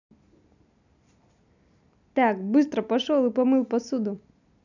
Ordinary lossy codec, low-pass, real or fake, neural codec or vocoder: none; 7.2 kHz; real; none